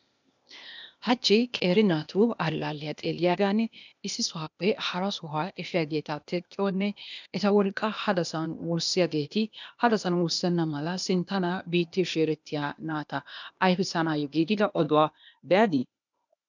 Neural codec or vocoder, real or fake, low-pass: codec, 16 kHz, 0.8 kbps, ZipCodec; fake; 7.2 kHz